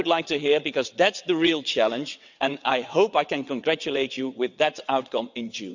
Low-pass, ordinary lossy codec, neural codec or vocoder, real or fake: 7.2 kHz; none; vocoder, 22.05 kHz, 80 mel bands, WaveNeXt; fake